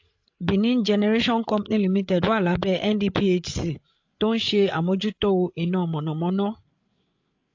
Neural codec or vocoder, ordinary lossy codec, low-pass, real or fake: codec, 16 kHz, 8 kbps, FreqCodec, larger model; AAC, 48 kbps; 7.2 kHz; fake